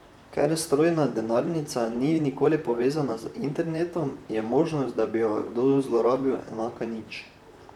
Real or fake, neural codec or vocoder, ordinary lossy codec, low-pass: fake; vocoder, 44.1 kHz, 128 mel bands, Pupu-Vocoder; none; 19.8 kHz